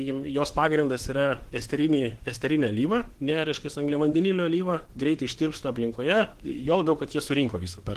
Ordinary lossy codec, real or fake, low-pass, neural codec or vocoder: Opus, 16 kbps; fake; 14.4 kHz; autoencoder, 48 kHz, 32 numbers a frame, DAC-VAE, trained on Japanese speech